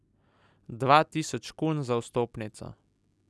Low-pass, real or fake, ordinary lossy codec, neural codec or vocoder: none; real; none; none